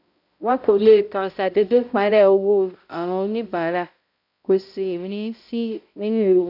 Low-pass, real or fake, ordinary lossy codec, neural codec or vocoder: 5.4 kHz; fake; none; codec, 16 kHz, 0.5 kbps, X-Codec, HuBERT features, trained on balanced general audio